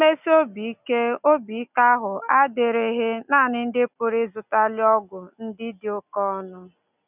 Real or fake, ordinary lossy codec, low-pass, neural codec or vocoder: real; none; 3.6 kHz; none